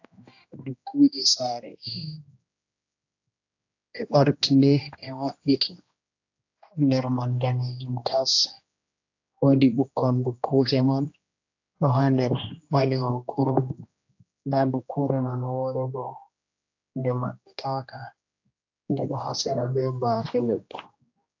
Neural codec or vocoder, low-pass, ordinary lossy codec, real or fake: codec, 16 kHz, 1 kbps, X-Codec, HuBERT features, trained on general audio; 7.2 kHz; AAC, 48 kbps; fake